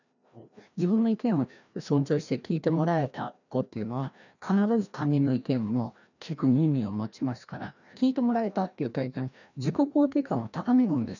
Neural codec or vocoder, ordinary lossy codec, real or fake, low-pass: codec, 16 kHz, 1 kbps, FreqCodec, larger model; none; fake; 7.2 kHz